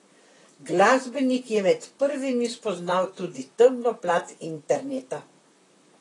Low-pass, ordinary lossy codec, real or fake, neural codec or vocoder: 10.8 kHz; AAC, 32 kbps; fake; vocoder, 44.1 kHz, 128 mel bands, Pupu-Vocoder